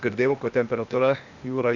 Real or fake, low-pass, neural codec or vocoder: fake; 7.2 kHz; codec, 16 kHz, 0.8 kbps, ZipCodec